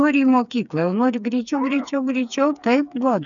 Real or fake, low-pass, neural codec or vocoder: fake; 7.2 kHz; codec, 16 kHz, 4 kbps, FreqCodec, smaller model